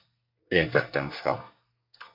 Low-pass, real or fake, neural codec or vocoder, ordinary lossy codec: 5.4 kHz; fake; codec, 24 kHz, 1 kbps, SNAC; MP3, 48 kbps